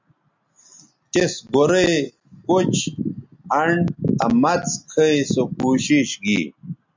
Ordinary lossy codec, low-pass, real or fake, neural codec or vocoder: MP3, 64 kbps; 7.2 kHz; real; none